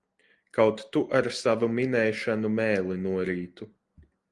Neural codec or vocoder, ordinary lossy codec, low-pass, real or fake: none; Opus, 24 kbps; 10.8 kHz; real